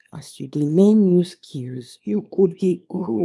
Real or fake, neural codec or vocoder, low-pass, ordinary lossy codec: fake; codec, 24 kHz, 0.9 kbps, WavTokenizer, small release; none; none